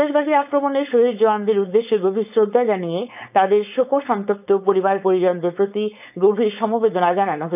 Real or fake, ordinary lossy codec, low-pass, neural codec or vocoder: fake; none; 3.6 kHz; codec, 16 kHz, 4.8 kbps, FACodec